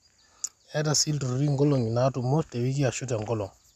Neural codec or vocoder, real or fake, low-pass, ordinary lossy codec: none; real; 14.4 kHz; none